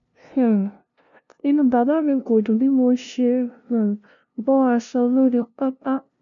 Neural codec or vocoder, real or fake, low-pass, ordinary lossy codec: codec, 16 kHz, 0.5 kbps, FunCodec, trained on LibriTTS, 25 frames a second; fake; 7.2 kHz; MP3, 96 kbps